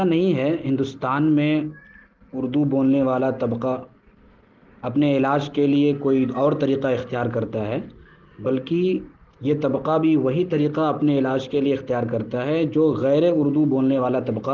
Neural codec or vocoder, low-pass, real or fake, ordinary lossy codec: none; 7.2 kHz; real; Opus, 16 kbps